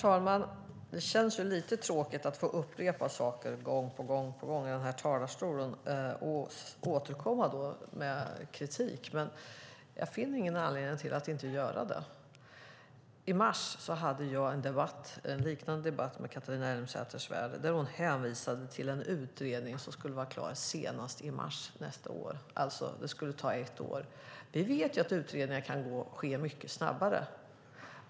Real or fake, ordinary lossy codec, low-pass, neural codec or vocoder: real; none; none; none